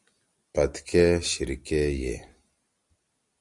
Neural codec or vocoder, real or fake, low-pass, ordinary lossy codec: none; real; 10.8 kHz; Opus, 64 kbps